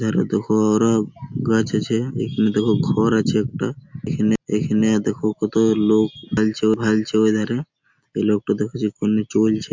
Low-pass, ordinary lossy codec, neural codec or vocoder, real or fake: 7.2 kHz; MP3, 64 kbps; none; real